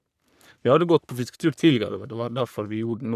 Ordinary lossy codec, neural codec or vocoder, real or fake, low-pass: none; codec, 44.1 kHz, 3.4 kbps, Pupu-Codec; fake; 14.4 kHz